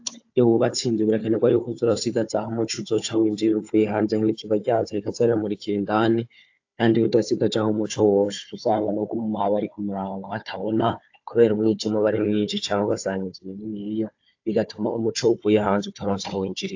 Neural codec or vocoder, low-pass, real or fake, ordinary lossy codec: codec, 16 kHz, 4 kbps, FunCodec, trained on Chinese and English, 50 frames a second; 7.2 kHz; fake; AAC, 48 kbps